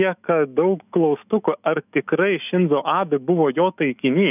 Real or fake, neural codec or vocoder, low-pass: fake; autoencoder, 48 kHz, 128 numbers a frame, DAC-VAE, trained on Japanese speech; 3.6 kHz